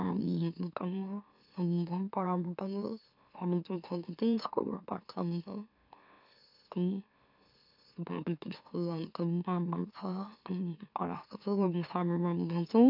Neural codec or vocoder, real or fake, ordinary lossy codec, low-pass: autoencoder, 44.1 kHz, a latent of 192 numbers a frame, MeloTTS; fake; none; 5.4 kHz